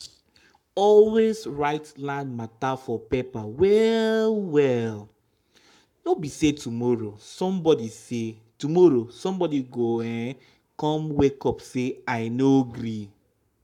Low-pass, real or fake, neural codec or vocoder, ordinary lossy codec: 19.8 kHz; fake; codec, 44.1 kHz, 7.8 kbps, Pupu-Codec; none